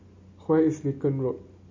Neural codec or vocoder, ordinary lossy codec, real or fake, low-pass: none; MP3, 32 kbps; real; 7.2 kHz